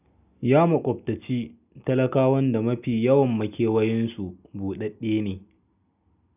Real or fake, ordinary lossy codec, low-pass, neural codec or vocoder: real; none; 3.6 kHz; none